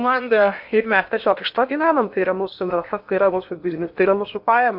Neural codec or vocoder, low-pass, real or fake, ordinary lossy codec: codec, 16 kHz in and 24 kHz out, 0.8 kbps, FocalCodec, streaming, 65536 codes; 5.4 kHz; fake; MP3, 48 kbps